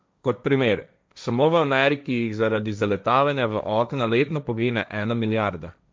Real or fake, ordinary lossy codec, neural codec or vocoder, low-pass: fake; none; codec, 16 kHz, 1.1 kbps, Voila-Tokenizer; none